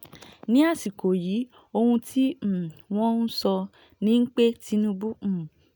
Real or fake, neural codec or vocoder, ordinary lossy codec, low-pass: real; none; none; none